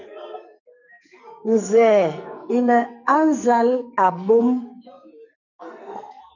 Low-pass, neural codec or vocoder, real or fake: 7.2 kHz; codec, 44.1 kHz, 2.6 kbps, SNAC; fake